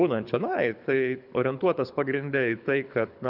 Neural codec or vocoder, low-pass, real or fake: codec, 24 kHz, 6 kbps, HILCodec; 5.4 kHz; fake